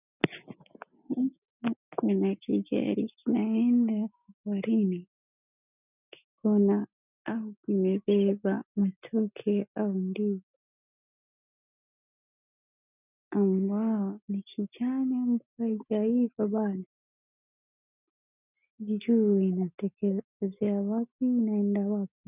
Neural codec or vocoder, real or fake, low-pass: none; real; 3.6 kHz